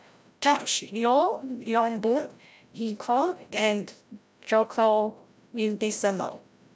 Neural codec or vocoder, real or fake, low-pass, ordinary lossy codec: codec, 16 kHz, 0.5 kbps, FreqCodec, larger model; fake; none; none